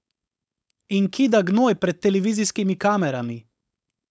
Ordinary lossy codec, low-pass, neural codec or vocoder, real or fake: none; none; codec, 16 kHz, 4.8 kbps, FACodec; fake